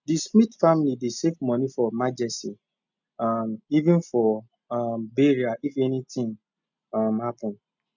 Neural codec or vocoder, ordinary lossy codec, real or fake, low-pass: none; none; real; 7.2 kHz